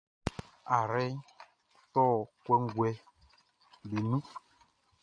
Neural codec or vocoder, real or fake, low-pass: none; real; 9.9 kHz